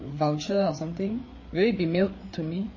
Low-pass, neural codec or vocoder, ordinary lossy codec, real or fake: 7.2 kHz; codec, 16 kHz, 4 kbps, FreqCodec, larger model; MP3, 32 kbps; fake